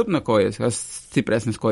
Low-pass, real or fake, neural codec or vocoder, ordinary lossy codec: 19.8 kHz; real; none; MP3, 48 kbps